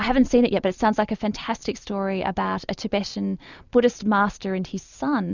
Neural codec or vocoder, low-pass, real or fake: none; 7.2 kHz; real